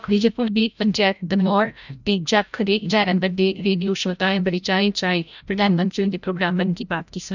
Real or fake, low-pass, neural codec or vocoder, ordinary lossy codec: fake; 7.2 kHz; codec, 16 kHz, 0.5 kbps, FreqCodec, larger model; none